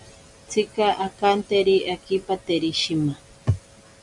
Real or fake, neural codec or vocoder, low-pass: real; none; 10.8 kHz